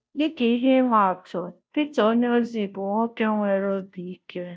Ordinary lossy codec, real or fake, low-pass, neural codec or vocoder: none; fake; none; codec, 16 kHz, 0.5 kbps, FunCodec, trained on Chinese and English, 25 frames a second